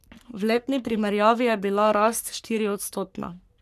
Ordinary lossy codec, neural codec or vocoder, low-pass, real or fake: none; codec, 44.1 kHz, 3.4 kbps, Pupu-Codec; 14.4 kHz; fake